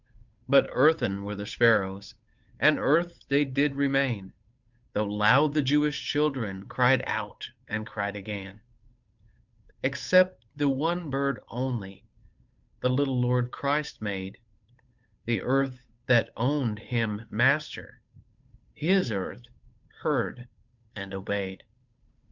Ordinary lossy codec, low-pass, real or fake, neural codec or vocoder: Opus, 64 kbps; 7.2 kHz; fake; codec, 16 kHz, 8 kbps, FunCodec, trained on Chinese and English, 25 frames a second